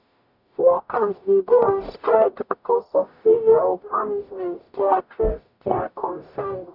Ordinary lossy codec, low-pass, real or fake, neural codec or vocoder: none; 5.4 kHz; fake; codec, 44.1 kHz, 0.9 kbps, DAC